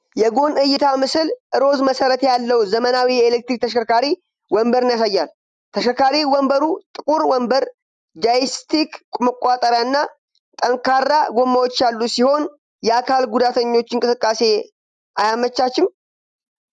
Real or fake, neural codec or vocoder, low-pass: real; none; 10.8 kHz